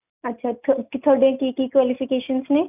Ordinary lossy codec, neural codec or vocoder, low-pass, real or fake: none; none; 3.6 kHz; real